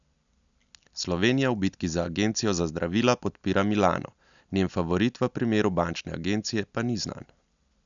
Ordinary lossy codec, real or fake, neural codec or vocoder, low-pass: none; real; none; 7.2 kHz